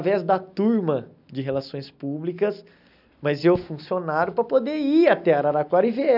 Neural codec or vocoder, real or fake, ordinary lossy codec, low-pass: none; real; none; 5.4 kHz